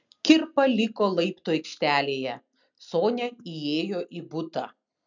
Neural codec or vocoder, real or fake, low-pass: none; real; 7.2 kHz